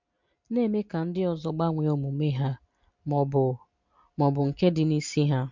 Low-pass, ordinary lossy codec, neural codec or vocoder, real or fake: 7.2 kHz; MP3, 64 kbps; none; real